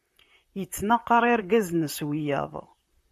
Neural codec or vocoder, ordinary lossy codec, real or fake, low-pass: vocoder, 44.1 kHz, 128 mel bands, Pupu-Vocoder; MP3, 96 kbps; fake; 14.4 kHz